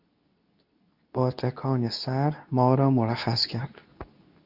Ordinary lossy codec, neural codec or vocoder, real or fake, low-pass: Opus, 64 kbps; codec, 24 kHz, 0.9 kbps, WavTokenizer, medium speech release version 2; fake; 5.4 kHz